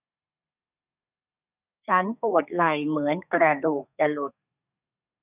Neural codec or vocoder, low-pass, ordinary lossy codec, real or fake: codec, 32 kHz, 1.9 kbps, SNAC; 3.6 kHz; none; fake